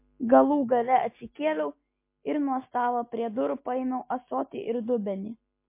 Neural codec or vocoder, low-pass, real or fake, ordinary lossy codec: none; 3.6 kHz; real; MP3, 24 kbps